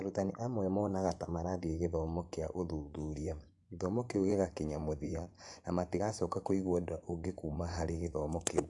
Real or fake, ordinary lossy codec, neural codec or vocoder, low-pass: fake; AAC, 64 kbps; vocoder, 24 kHz, 100 mel bands, Vocos; 10.8 kHz